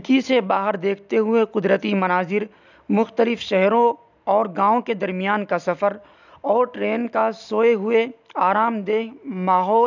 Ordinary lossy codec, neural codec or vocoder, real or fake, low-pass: none; none; real; 7.2 kHz